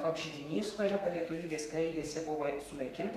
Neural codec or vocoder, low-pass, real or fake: codec, 32 kHz, 1.9 kbps, SNAC; 14.4 kHz; fake